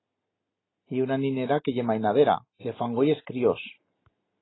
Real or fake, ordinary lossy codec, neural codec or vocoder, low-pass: real; AAC, 16 kbps; none; 7.2 kHz